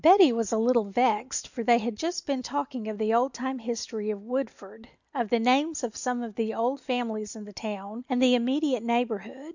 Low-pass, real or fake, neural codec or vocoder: 7.2 kHz; real; none